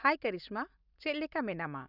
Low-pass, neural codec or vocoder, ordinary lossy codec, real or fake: 5.4 kHz; none; none; real